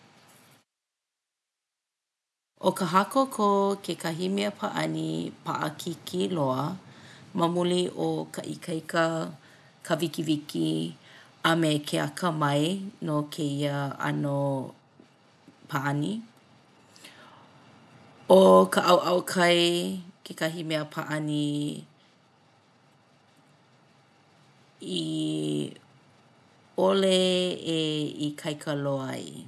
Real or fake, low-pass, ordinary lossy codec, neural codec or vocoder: real; none; none; none